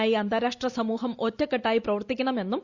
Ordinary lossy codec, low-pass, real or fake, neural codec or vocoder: Opus, 64 kbps; 7.2 kHz; real; none